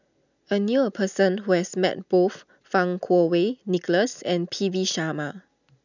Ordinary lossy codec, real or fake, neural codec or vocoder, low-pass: none; real; none; 7.2 kHz